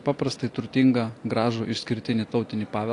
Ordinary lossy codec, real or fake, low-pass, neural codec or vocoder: AAC, 48 kbps; real; 10.8 kHz; none